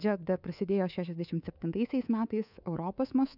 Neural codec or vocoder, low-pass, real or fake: codec, 24 kHz, 3.1 kbps, DualCodec; 5.4 kHz; fake